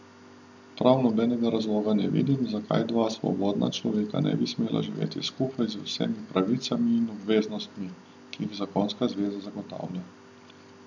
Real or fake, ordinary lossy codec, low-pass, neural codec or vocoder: real; none; 7.2 kHz; none